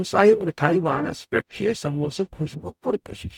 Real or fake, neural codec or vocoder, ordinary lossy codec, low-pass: fake; codec, 44.1 kHz, 0.9 kbps, DAC; none; 19.8 kHz